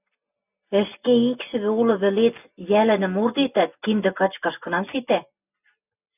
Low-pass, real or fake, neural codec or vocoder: 3.6 kHz; real; none